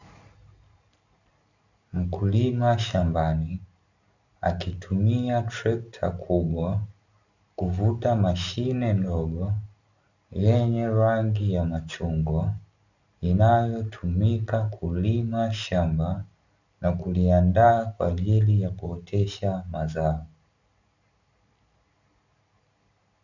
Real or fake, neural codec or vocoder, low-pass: real; none; 7.2 kHz